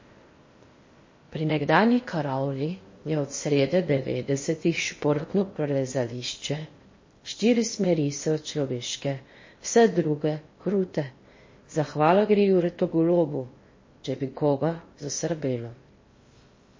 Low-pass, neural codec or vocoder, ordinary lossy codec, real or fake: 7.2 kHz; codec, 16 kHz in and 24 kHz out, 0.8 kbps, FocalCodec, streaming, 65536 codes; MP3, 32 kbps; fake